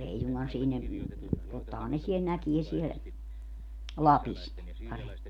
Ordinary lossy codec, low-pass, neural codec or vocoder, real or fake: none; 19.8 kHz; none; real